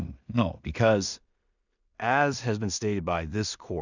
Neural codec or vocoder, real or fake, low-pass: codec, 16 kHz in and 24 kHz out, 0.4 kbps, LongCat-Audio-Codec, two codebook decoder; fake; 7.2 kHz